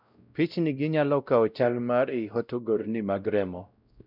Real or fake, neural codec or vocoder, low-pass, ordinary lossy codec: fake; codec, 16 kHz, 0.5 kbps, X-Codec, WavLM features, trained on Multilingual LibriSpeech; 5.4 kHz; none